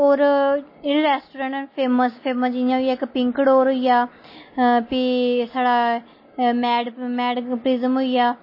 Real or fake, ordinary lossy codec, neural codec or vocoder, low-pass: real; MP3, 24 kbps; none; 5.4 kHz